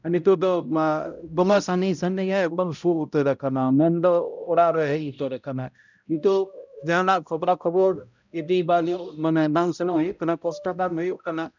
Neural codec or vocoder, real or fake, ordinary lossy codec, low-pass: codec, 16 kHz, 0.5 kbps, X-Codec, HuBERT features, trained on balanced general audio; fake; none; 7.2 kHz